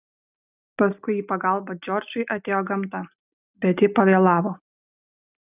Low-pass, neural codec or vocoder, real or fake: 3.6 kHz; none; real